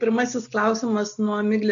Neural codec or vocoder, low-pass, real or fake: none; 7.2 kHz; real